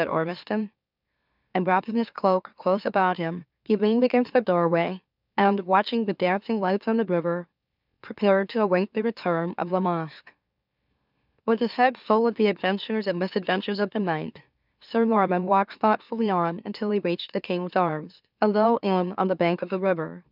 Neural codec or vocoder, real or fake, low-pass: autoencoder, 44.1 kHz, a latent of 192 numbers a frame, MeloTTS; fake; 5.4 kHz